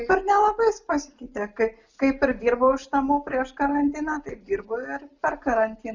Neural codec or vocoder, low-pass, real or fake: none; 7.2 kHz; real